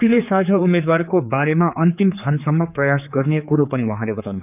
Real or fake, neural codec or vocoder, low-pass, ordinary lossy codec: fake; codec, 16 kHz, 4 kbps, X-Codec, HuBERT features, trained on general audio; 3.6 kHz; none